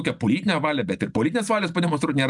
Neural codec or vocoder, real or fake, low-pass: none; real; 10.8 kHz